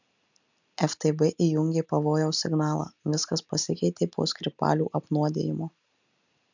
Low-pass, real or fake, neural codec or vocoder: 7.2 kHz; real; none